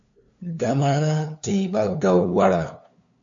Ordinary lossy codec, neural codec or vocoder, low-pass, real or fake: MP3, 64 kbps; codec, 16 kHz, 2 kbps, FunCodec, trained on LibriTTS, 25 frames a second; 7.2 kHz; fake